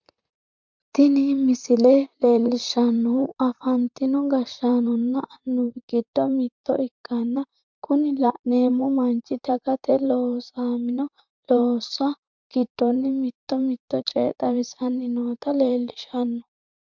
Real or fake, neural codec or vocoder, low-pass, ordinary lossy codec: fake; vocoder, 44.1 kHz, 128 mel bands, Pupu-Vocoder; 7.2 kHz; MP3, 64 kbps